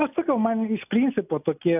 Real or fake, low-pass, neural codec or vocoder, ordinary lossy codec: real; 3.6 kHz; none; AAC, 32 kbps